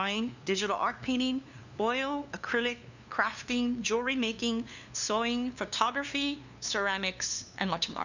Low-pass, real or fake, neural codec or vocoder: 7.2 kHz; fake; codec, 16 kHz, 2 kbps, FunCodec, trained on LibriTTS, 25 frames a second